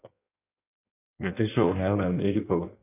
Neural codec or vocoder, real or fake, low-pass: codec, 16 kHz in and 24 kHz out, 1.1 kbps, FireRedTTS-2 codec; fake; 3.6 kHz